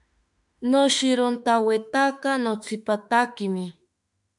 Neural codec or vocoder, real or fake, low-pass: autoencoder, 48 kHz, 32 numbers a frame, DAC-VAE, trained on Japanese speech; fake; 10.8 kHz